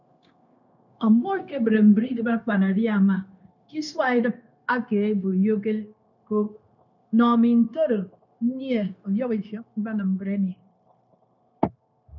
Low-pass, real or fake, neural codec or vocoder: 7.2 kHz; fake; codec, 16 kHz, 0.9 kbps, LongCat-Audio-Codec